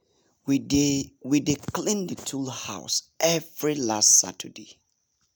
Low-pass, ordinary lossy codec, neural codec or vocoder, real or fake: none; none; none; real